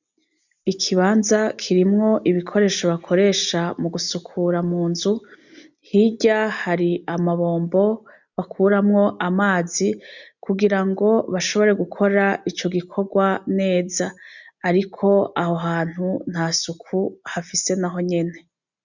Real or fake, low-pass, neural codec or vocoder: real; 7.2 kHz; none